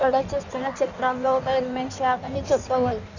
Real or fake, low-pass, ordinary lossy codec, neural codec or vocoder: fake; 7.2 kHz; none; codec, 16 kHz in and 24 kHz out, 1.1 kbps, FireRedTTS-2 codec